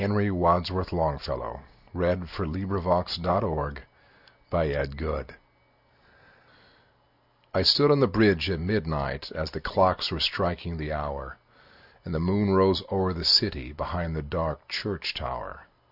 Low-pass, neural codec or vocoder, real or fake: 5.4 kHz; none; real